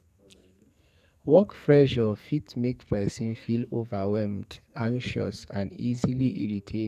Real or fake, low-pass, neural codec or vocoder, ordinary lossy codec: fake; 14.4 kHz; codec, 44.1 kHz, 2.6 kbps, SNAC; none